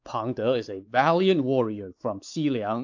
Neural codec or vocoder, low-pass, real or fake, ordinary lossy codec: codec, 16 kHz, 4 kbps, X-Codec, WavLM features, trained on Multilingual LibriSpeech; 7.2 kHz; fake; AAC, 48 kbps